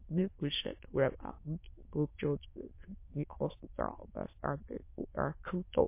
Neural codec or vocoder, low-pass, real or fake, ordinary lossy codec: autoencoder, 22.05 kHz, a latent of 192 numbers a frame, VITS, trained on many speakers; 3.6 kHz; fake; MP3, 24 kbps